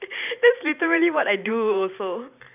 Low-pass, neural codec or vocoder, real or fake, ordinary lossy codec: 3.6 kHz; vocoder, 44.1 kHz, 128 mel bands every 512 samples, BigVGAN v2; fake; none